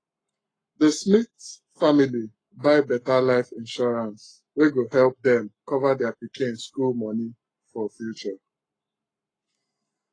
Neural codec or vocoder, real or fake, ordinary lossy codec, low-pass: codec, 44.1 kHz, 7.8 kbps, Pupu-Codec; fake; AAC, 32 kbps; 9.9 kHz